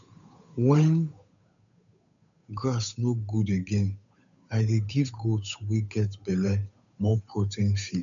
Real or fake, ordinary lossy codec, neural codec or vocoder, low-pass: fake; none; codec, 16 kHz, 8 kbps, FunCodec, trained on Chinese and English, 25 frames a second; 7.2 kHz